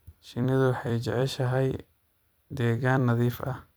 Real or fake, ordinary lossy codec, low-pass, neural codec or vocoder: fake; none; none; vocoder, 44.1 kHz, 128 mel bands every 256 samples, BigVGAN v2